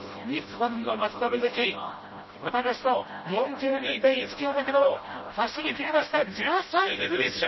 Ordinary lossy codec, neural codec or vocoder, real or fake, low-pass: MP3, 24 kbps; codec, 16 kHz, 0.5 kbps, FreqCodec, smaller model; fake; 7.2 kHz